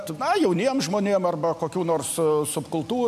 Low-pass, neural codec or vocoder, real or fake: 14.4 kHz; none; real